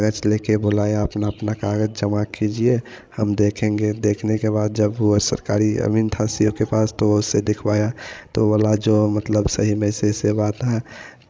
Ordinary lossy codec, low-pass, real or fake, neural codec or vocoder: none; none; fake; codec, 16 kHz, 16 kbps, FreqCodec, larger model